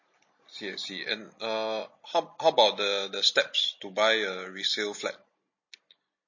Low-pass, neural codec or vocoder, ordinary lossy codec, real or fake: 7.2 kHz; none; MP3, 32 kbps; real